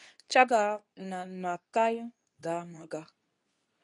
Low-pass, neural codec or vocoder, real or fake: 10.8 kHz; codec, 24 kHz, 0.9 kbps, WavTokenizer, medium speech release version 2; fake